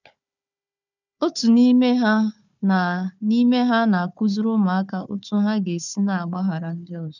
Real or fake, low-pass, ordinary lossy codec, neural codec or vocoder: fake; 7.2 kHz; none; codec, 16 kHz, 4 kbps, FunCodec, trained on Chinese and English, 50 frames a second